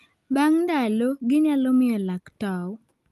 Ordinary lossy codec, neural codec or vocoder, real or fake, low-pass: Opus, 32 kbps; none; real; 14.4 kHz